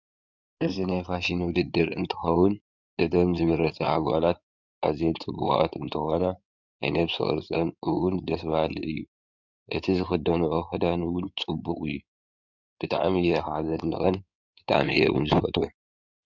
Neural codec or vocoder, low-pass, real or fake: codec, 16 kHz in and 24 kHz out, 2.2 kbps, FireRedTTS-2 codec; 7.2 kHz; fake